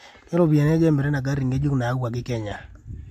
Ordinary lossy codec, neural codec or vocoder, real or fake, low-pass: MP3, 64 kbps; none; real; 14.4 kHz